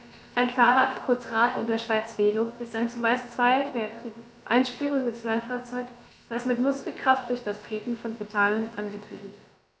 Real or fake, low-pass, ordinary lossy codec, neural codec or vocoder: fake; none; none; codec, 16 kHz, about 1 kbps, DyCAST, with the encoder's durations